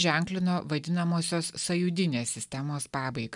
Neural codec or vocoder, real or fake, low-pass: none; real; 10.8 kHz